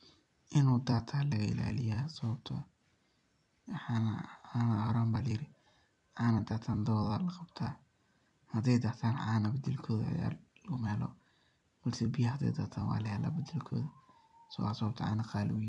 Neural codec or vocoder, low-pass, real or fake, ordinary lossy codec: vocoder, 44.1 kHz, 128 mel bands every 256 samples, BigVGAN v2; 10.8 kHz; fake; none